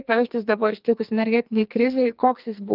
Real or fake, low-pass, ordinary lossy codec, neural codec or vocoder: fake; 5.4 kHz; Opus, 32 kbps; codec, 44.1 kHz, 2.6 kbps, SNAC